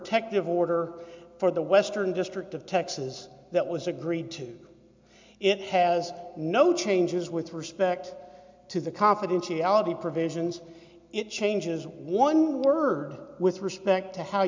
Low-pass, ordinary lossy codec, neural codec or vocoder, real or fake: 7.2 kHz; MP3, 64 kbps; none; real